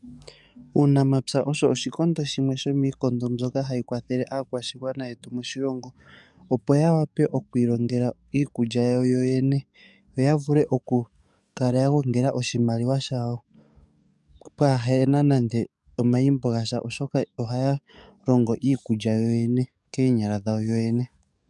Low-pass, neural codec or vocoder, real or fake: 10.8 kHz; codec, 44.1 kHz, 7.8 kbps, DAC; fake